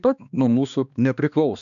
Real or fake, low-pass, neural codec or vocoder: fake; 7.2 kHz; codec, 16 kHz, 2 kbps, X-Codec, HuBERT features, trained on balanced general audio